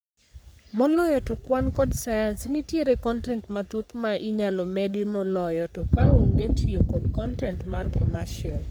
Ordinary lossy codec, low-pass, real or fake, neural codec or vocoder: none; none; fake; codec, 44.1 kHz, 3.4 kbps, Pupu-Codec